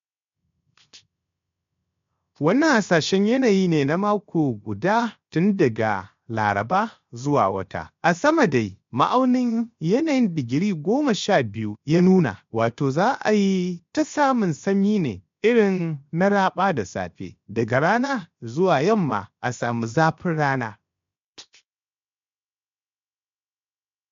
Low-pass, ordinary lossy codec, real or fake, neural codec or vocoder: 7.2 kHz; MP3, 48 kbps; fake; codec, 16 kHz, 0.7 kbps, FocalCodec